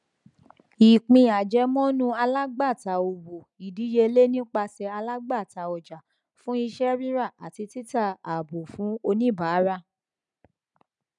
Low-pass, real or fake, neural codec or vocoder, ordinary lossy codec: 10.8 kHz; real; none; none